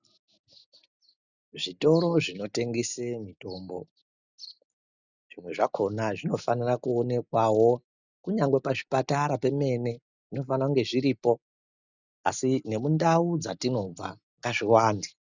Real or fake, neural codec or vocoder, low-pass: real; none; 7.2 kHz